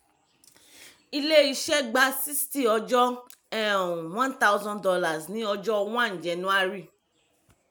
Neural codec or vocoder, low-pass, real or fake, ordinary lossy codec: none; none; real; none